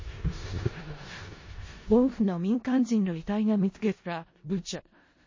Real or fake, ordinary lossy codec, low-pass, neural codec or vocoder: fake; MP3, 32 kbps; 7.2 kHz; codec, 16 kHz in and 24 kHz out, 0.4 kbps, LongCat-Audio-Codec, four codebook decoder